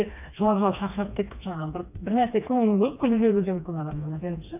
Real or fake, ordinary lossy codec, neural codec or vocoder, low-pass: fake; none; codec, 16 kHz, 2 kbps, FreqCodec, smaller model; 3.6 kHz